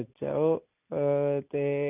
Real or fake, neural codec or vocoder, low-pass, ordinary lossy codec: real; none; 3.6 kHz; none